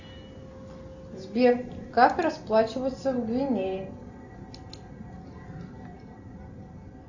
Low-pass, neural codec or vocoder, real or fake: 7.2 kHz; none; real